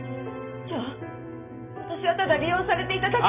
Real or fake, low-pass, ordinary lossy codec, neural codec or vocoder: real; 3.6 kHz; none; none